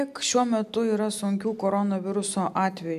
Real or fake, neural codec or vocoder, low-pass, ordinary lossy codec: real; none; 14.4 kHz; AAC, 96 kbps